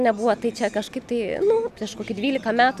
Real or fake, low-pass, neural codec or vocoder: real; 14.4 kHz; none